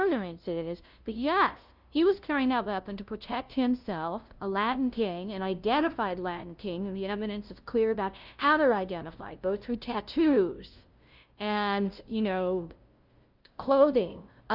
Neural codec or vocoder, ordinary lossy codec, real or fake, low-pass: codec, 16 kHz, 0.5 kbps, FunCodec, trained on LibriTTS, 25 frames a second; Opus, 24 kbps; fake; 5.4 kHz